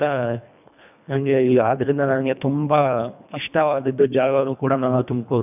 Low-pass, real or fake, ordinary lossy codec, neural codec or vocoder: 3.6 kHz; fake; none; codec, 24 kHz, 1.5 kbps, HILCodec